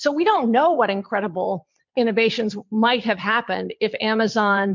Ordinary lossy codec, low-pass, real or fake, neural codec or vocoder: MP3, 64 kbps; 7.2 kHz; fake; vocoder, 22.05 kHz, 80 mel bands, Vocos